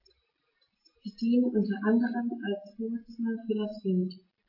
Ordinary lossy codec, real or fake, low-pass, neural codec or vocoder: AAC, 32 kbps; real; 5.4 kHz; none